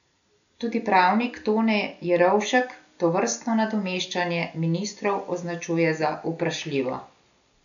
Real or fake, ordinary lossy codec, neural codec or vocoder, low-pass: real; none; none; 7.2 kHz